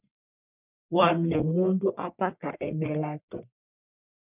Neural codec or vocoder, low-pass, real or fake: codec, 44.1 kHz, 1.7 kbps, Pupu-Codec; 3.6 kHz; fake